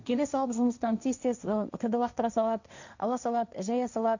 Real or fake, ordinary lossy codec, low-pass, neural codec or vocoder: fake; none; 7.2 kHz; codec, 16 kHz, 1.1 kbps, Voila-Tokenizer